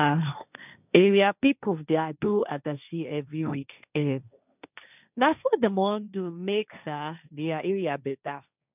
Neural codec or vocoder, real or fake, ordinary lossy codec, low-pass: codec, 16 kHz, 1.1 kbps, Voila-Tokenizer; fake; none; 3.6 kHz